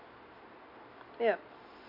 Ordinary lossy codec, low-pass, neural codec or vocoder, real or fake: none; 5.4 kHz; none; real